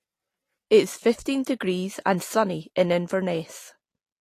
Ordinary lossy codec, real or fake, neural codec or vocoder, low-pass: AAC, 48 kbps; real; none; 14.4 kHz